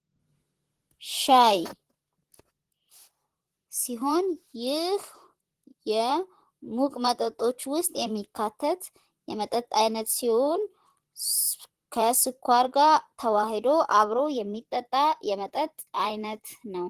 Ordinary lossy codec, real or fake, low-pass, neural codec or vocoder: Opus, 16 kbps; fake; 14.4 kHz; vocoder, 44.1 kHz, 128 mel bands, Pupu-Vocoder